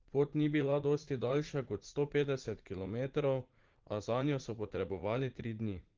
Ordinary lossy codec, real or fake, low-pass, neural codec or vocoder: Opus, 16 kbps; fake; 7.2 kHz; vocoder, 44.1 kHz, 80 mel bands, Vocos